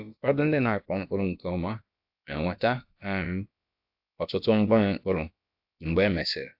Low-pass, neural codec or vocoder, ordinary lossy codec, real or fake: 5.4 kHz; codec, 16 kHz, about 1 kbps, DyCAST, with the encoder's durations; AAC, 48 kbps; fake